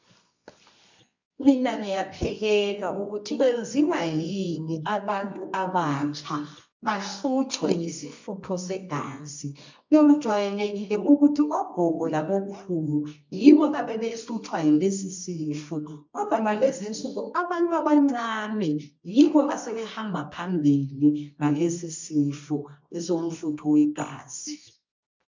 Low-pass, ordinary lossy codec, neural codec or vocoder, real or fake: 7.2 kHz; MP3, 64 kbps; codec, 24 kHz, 0.9 kbps, WavTokenizer, medium music audio release; fake